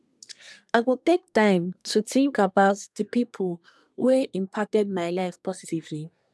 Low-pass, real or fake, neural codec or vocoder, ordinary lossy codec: none; fake; codec, 24 kHz, 1 kbps, SNAC; none